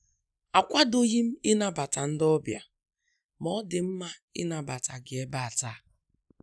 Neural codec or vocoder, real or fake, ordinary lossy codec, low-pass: none; real; none; none